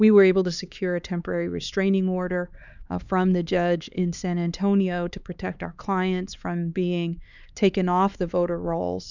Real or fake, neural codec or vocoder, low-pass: fake; codec, 16 kHz, 4 kbps, X-Codec, HuBERT features, trained on LibriSpeech; 7.2 kHz